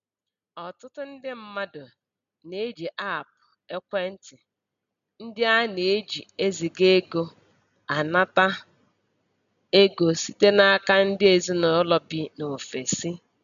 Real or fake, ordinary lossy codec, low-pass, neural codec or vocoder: real; none; 7.2 kHz; none